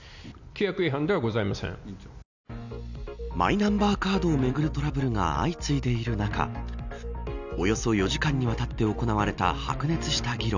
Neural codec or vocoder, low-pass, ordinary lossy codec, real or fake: none; 7.2 kHz; none; real